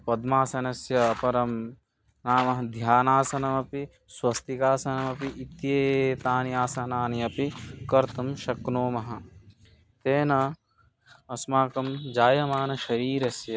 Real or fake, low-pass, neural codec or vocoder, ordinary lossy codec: real; none; none; none